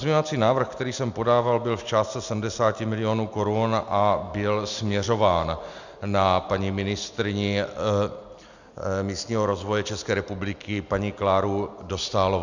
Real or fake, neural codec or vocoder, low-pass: real; none; 7.2 kHz